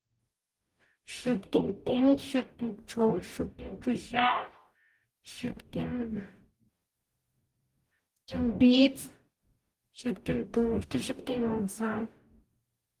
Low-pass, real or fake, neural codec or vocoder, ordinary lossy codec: 14.4 kHz; fake; codec, 44.1 kHz, 0.9 kbps, DAC; Opus, 24 kbps